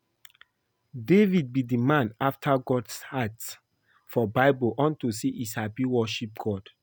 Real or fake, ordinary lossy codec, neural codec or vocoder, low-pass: real; none; none; none